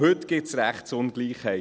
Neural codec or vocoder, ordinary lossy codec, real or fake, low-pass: none; none; real; none